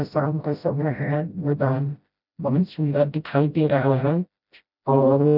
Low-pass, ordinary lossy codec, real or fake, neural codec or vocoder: 5.4 kHz; none; fake; codec, 16 kHz, 0.5 kbps, FreqCodec, smaller model